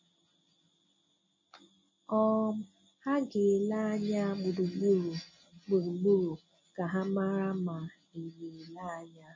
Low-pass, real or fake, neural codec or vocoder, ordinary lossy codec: 7.2 kHz; real; none; MP3, 32 kbps